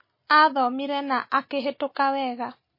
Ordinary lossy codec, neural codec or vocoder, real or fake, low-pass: MP3, 24 kbps; none; real; 5.4 kHz